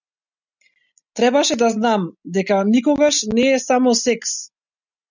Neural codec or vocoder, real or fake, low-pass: none; real; 7.2 kHz